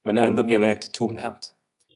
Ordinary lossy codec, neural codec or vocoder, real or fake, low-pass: AAC, 96 kbps; codec, 24 kHz, 0.9 kbps, WavTokenizer, medium music audio release; fake; 10.8 kHz